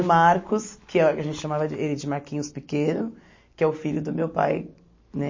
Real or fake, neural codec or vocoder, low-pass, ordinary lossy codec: real; none; 7.2 kHz; MP3, 32 kbps